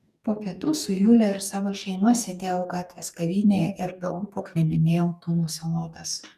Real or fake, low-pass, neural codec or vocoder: fake; 14.4 kHz; codec, 44.1 kHz, 2.6 kbps, DAC